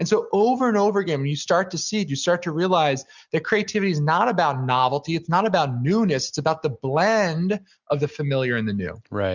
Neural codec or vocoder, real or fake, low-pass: none; real; 7.2 kHz